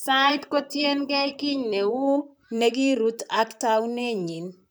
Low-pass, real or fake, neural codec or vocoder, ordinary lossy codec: none; fake; vocoder, 44.1 kHz, 128 mel bands, Pupu-Vocoder; none